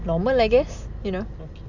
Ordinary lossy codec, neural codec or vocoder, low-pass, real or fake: none; none; 7.2 kHz; real